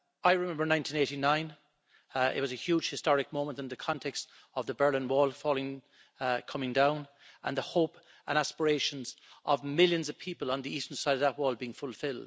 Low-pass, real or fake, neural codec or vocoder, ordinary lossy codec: none; real; none; none